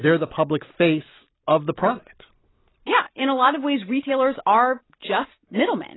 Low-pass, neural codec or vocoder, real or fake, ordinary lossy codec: 7.2 kHz; none; real; AAC, 16 kbps